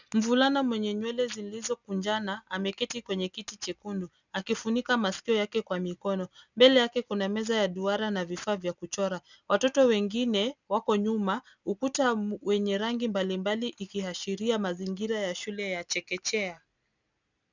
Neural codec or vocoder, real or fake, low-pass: none; real; 7.2 kHz